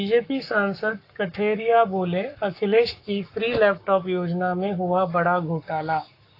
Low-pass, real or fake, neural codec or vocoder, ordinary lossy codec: 5.4 kHz; fake; codec, 44.1 kHz, 7.8 kbps, Pupu-Codec; AAC, 32 kbps